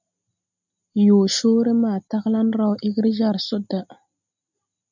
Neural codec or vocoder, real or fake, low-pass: none; real; 7.2 kHz